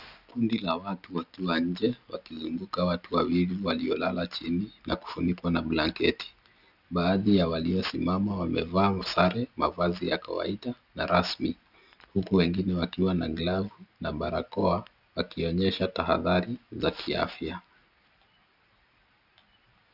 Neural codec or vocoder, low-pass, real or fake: none; 5.4 kHz; real